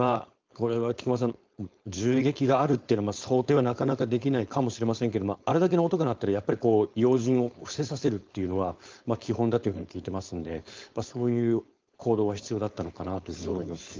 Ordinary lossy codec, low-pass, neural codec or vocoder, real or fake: Opus, 32 kbps; 7.2 kHz; codec, 16 kHz, 4.8 kbps, FACodec; fake